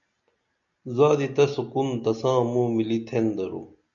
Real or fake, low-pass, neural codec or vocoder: real; 7.2 kHz; none